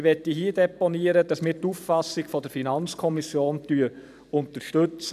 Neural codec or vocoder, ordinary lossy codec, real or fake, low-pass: vocoder, 44.1 kHz, 128 mel bands every 512 samples, BigVGAN v2; none; fake; 14.4 kHz